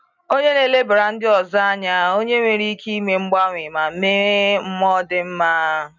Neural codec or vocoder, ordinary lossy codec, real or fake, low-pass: none; none; real; 7.2 kHz